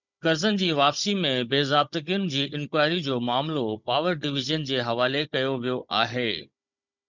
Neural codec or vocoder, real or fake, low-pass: codec, 16 kHz, 16 kbps, FunCodec, trained on Chinese and English, 50 frames a second; fake; 7.2 kHz